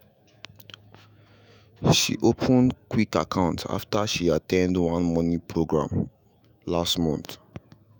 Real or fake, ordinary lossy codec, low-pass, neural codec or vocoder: fake; none; none; autoencoder, 48 kHz, 128 numbers a frame, DAC-VAE, trained on Japanese speech